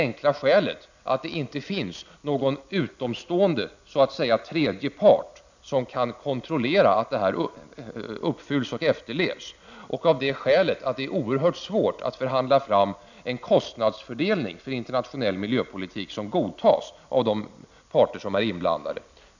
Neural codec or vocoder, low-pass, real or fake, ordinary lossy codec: none; 7.2 kHz; real; none